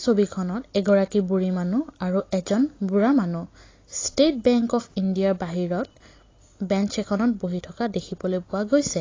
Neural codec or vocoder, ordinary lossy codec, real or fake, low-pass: none; AAC, 32 kbps; real; 7.2 kHz